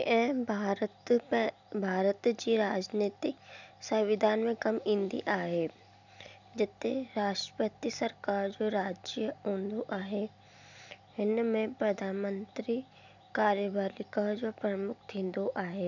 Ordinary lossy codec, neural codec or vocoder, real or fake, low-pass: none; none; real; 7.2 kHz